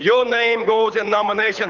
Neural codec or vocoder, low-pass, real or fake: codec, 24 kHz, 6 kbps, HILCodec; 7.2 kHz; fake